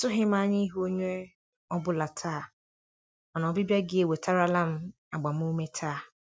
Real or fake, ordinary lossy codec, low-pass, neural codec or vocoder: real; none; none; none